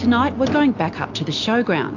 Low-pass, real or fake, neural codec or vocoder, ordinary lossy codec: 7.2 kHz; real; none; AAC, 48 kbps